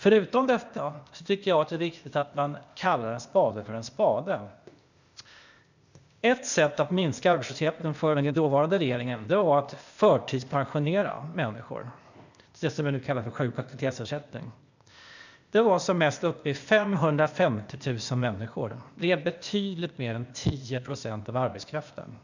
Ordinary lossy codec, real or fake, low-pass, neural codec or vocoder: none; fake; 7.2 kHz; codec, 16 kHz, 0.8 kbps, ZipCodec